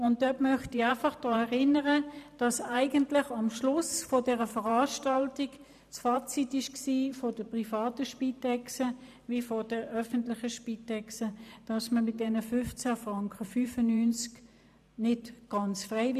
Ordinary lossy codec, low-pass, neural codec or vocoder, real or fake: none; 14.4 kHz; vocoder, 44.1 kHz, 128 mel bands every 512 samples, BigVGAN v2; fake